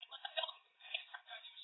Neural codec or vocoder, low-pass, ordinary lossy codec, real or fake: none; 7.2 kHz; AAC, 16 kbps; real